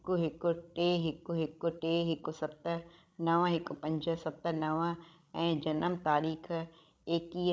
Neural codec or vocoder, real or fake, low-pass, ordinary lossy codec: codec, 16 kHz, 16 kbps, FreqCodec, larger model; fake; none; none